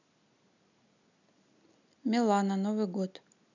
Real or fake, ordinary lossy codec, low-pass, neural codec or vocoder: real; none; 7.2 kHz; none